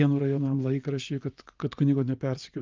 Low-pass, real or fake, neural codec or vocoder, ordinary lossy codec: 7.2 kHz; fake; vocoder, 24 kHz, 100 mel bands, Vocos; Opus, 24 kbps